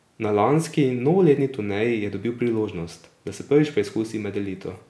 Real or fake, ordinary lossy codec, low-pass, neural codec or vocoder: real; none; none; none